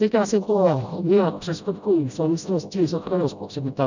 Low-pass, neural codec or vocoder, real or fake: 7.2 kHz; codec, 16 kHz, 0.5 kbps, FreqCodec, smaller model; fake